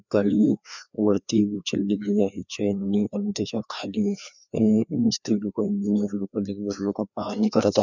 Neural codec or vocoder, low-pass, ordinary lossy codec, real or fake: codec, 16 kHz, 2 kbps, FreqCodec, larger model; 7.2 kHz; none; fake